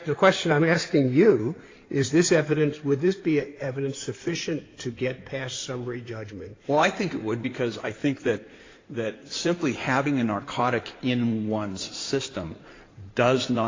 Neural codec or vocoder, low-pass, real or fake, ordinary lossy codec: codec, 16 kHz in and 24 kHz out, 2.2 kbps, FireRedTTS-2 codec; 7.2 kHz; fake; AAC, 32 kbps